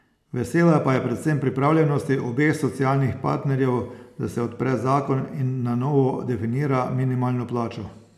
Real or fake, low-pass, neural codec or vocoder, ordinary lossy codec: real; 14.4 kHz; none; AAC, 96 kbps